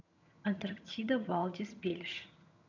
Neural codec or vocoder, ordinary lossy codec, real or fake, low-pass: vocoder, 22.05 kHz, 80 mel bands, HiFi-GAN; none; fake; 7.2 kHz